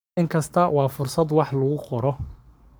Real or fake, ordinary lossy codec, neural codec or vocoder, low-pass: fake; none; codec, 44.1 kHz, 7.8 kbps, Pupu-Codec; none